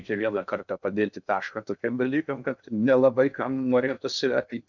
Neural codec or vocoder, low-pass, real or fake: codec, 16 kHz in and 24 kHz out, 0.6 kbps, FocalCodec, streaming, 2048 codes; 7.2 kHz; fake